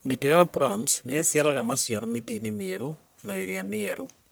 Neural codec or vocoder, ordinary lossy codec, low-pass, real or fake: codec, 44.1 kHz, 1.7 kbps, Pupu-Codec; none; none; fake